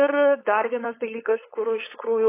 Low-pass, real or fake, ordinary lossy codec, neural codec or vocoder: 3.6 kHz; fake; AAC, 16 kbps; codec, 16 kHz, 4.8 kbps, FACodec